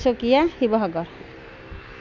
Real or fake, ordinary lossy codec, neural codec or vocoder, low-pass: real; none; none; 7.2 kHz